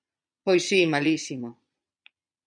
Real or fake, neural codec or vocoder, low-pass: fake; vocoder, 22.05 kHz, 80 mel bands, Vocos; 9.9 kHz